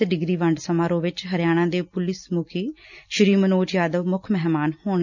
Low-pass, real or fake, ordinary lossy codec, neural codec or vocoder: 7.2 kHz; real; none; none